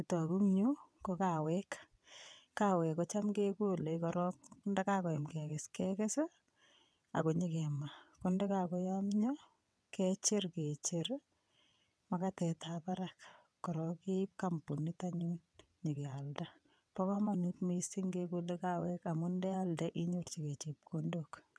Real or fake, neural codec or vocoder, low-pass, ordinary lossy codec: fake; vocoder, 22.05 kHz, 80 mel bands, WaveNeXt; none; none